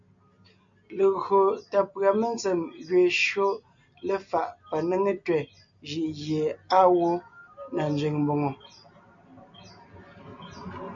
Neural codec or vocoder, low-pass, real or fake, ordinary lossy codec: none; 7.2 kHz; real; MP3, 48 kbps